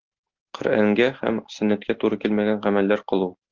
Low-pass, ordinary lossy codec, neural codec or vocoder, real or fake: 7.2 kHz; Opus, 16 kbps; none; real